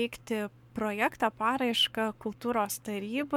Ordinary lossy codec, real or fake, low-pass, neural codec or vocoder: MP3, 96 kbps; fake; 19.8 kHz; codec, 44.1 kHz, 7.8 kbps, Pupu-Codec